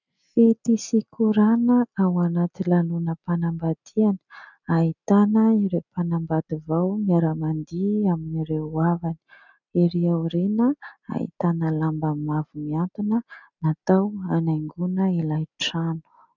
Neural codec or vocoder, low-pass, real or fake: none; 7.2 kHz; real